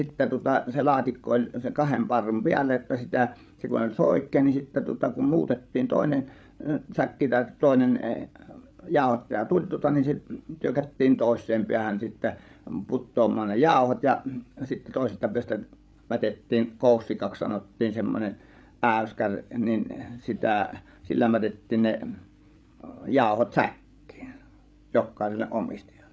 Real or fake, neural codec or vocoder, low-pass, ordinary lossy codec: fake; codec, 16 kHz, 8 kbps, FreqCodec, larger model; none; none